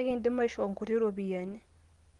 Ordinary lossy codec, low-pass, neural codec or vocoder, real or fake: Opus, 24 kbps; 10.8 kHz; none; real